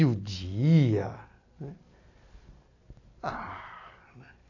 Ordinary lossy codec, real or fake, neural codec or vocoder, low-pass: none; real; none; 7.2 kHz